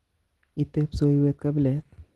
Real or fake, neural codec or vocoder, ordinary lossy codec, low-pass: real; none; Opus, 16 kbps; 10.8 kHz